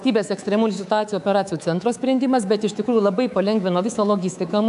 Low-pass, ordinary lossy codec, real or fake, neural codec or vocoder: 10.8 kHz; AAC, 96 kbps; fake; codec, 24 kHz, 3.1 kbps, DualCodec